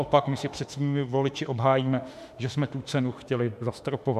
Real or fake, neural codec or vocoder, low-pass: fake; autoencoder, 48 kHz, 32 numbers a frame, DAC-VAE, trained on Japanese speech; 14.4 kHz